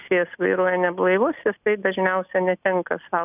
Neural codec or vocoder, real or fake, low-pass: none; real; 3.6 kHz